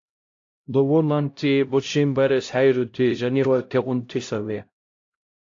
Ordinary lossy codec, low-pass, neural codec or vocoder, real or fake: AAC, 48 kbps; 7.2 kHz; codec, 16 kHz, 0.5 kbps, X-Codec, HuBERT features, trained on LibriSpeech; fake